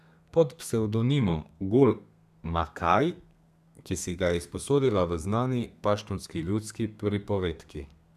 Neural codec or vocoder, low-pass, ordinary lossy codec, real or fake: codec, 32 kHz, 1.9 kbps, SNAC; 14.4 kHz; none; fake